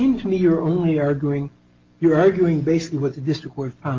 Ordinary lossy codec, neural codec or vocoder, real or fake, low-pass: Opus, 24 kbps; none; real; 7.2 kHz